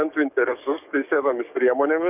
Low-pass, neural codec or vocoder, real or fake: 3.6 kHz; codec, 44.1 kHz, 7.8 kbps, DAC; fake